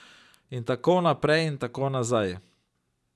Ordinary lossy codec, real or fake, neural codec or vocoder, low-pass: none; real; none; none